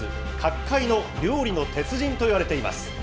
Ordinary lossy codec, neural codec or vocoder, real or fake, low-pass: none; none; real; none